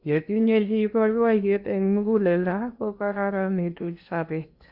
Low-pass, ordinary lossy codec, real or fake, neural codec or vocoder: 5.4 kHz; Opus, 64 kbps; fake; codec, 16 kHz in and 24 kHz out, 0.8 kbps, FocalCodec, streaming, 65536 codes